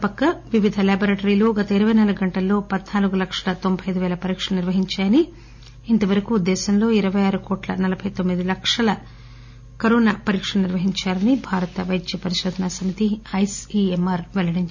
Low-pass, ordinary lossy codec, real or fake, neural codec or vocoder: 7.2 kHz; none; real; none